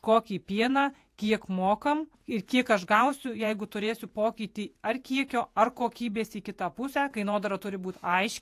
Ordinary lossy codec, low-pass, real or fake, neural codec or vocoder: AAC, 64 kbps; 14.4 kHz; fake; vocoder, 48 kHz, 128 mel bands, Vocos